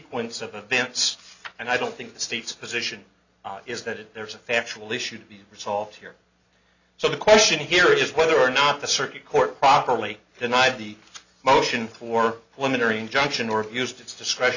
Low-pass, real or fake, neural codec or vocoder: 7.2 kHz; real; none